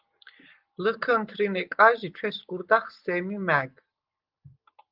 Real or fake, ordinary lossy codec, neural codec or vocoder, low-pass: real; Opus, 24 kbps; none; 5.4 kHz